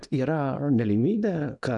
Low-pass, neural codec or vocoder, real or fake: 10.8 kHz; codec, 24 kHz, 0.9 kbps, WavTokenizer, small release; fake